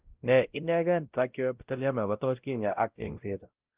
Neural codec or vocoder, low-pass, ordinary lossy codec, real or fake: codec, 16 kHz, 0.5 kbps, X-Codec, WavLM features, trained on Multilingual LibriSpeech; 3.6 kHz; Opus, 32 kbps; fake